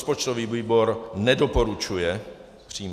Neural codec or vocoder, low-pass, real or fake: none; 14.4 kHz; real